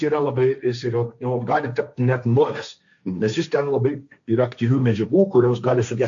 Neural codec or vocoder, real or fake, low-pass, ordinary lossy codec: codec, 16 kHz, 1.1 kbps, Voila-Tokenizer; fake; 7.2 kHz; AAC, 64 kbps